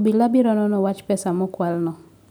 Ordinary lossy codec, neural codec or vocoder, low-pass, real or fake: none; none; 19.8 kHz; real